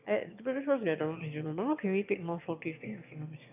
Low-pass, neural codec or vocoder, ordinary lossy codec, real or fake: 3.6 kHz; autoencoder, 22.05 kHz, a latent of 192 numbers a frame, VITS, trained on one speaker; none; fake